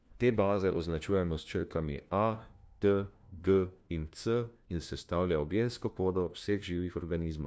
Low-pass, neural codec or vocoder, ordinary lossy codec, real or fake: none; codec, 16 kHz, 1 kbps, FunCodec, trained on LibriTTS, 50 frames a second; none; fake